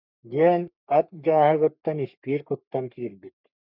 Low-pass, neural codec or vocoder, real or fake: 5.4 kHz; codec, 44.1 kHz, 3.4 kbps, Pupu-Codec; fake